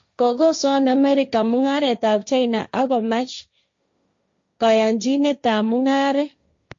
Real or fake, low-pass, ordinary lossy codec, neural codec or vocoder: fake; 7.2 kHz; MP3, 48 kbps; codec, 16 kHz, 1.1 kbps, Voila-Tokenizer